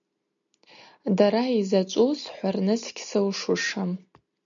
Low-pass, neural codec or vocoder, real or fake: 7.2 kHz; none; real